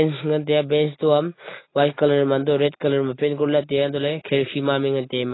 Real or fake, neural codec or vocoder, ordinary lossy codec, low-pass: real; none; AAC, 16 kbps; 7.2 kHz